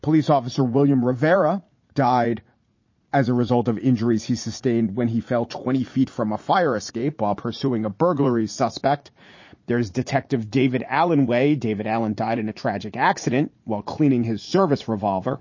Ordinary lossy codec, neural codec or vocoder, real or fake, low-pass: MP3, 32 kbps; vocoder, 44.1 kHz, 80 mel bands, Vocos; fake; 7.2 kHz